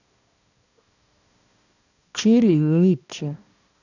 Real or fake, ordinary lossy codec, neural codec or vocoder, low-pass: fake; none; codec, 16 kHz, 1 kbps, X-Codec, HuBERT features, trained on balanced general audio; 7.2 kHz